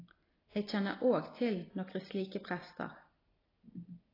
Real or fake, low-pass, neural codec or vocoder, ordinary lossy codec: real; 5.4 kHz; none; AAC, 24 kbps